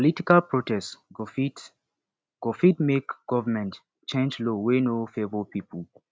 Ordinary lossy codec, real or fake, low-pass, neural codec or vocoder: none; real; none; none